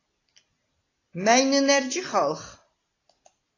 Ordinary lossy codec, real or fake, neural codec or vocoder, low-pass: AAC, 32 kbps; real; none; 7.2 kHz